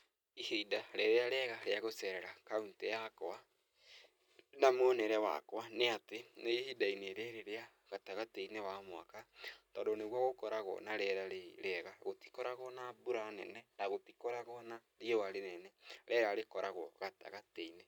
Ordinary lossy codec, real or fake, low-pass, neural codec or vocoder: none; real; 19.8 kHz; none